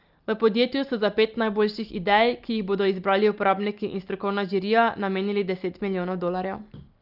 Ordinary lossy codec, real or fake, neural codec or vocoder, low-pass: Opus, 24 kbps; real; none; 5.4 kHz